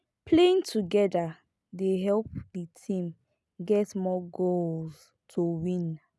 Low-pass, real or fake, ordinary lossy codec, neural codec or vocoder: none; real; none; none